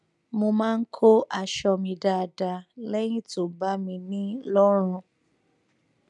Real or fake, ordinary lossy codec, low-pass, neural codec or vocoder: real; none; 10.8 kHz; none